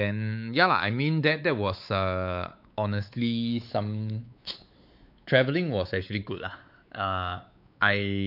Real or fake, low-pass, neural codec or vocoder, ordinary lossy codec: fake; 5.4 kHz; codec, 16 kHz, 4 kbps, X-Codec, WavLM features, trained on Multilingual LibriSpeech; none